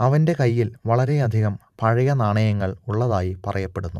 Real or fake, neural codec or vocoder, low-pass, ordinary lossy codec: fake; vocoder, 44.1 kHz, 128 mel bands every 512 samples, BigVGAN v2; 14.4 kHz; none